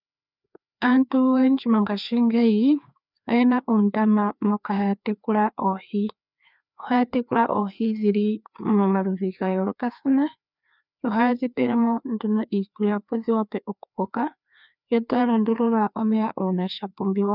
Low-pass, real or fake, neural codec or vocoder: 5.4 kHz; fake; codec, 16 kHz, 2 kbps, FreqCodec, larger model